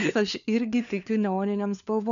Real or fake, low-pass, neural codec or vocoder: fake; 7.2 kHz; codec, 16 kHz, 4 kbps, FunCodec, trained on LibriTTS, 50 frames a second